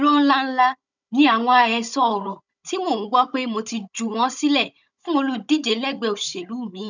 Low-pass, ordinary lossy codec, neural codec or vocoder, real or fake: 7.2 kHz; none; codec, 16 kHz, 16 kbps, FunCodec, trained on Chinese and English, 50 frames a second; fake